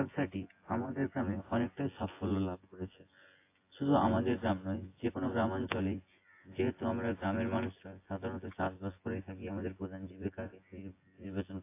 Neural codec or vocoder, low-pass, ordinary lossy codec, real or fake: vocoder, 24 kHz, 100 mel bands, Vocos; 3.6 kHz; AAC, 24 kbps; fake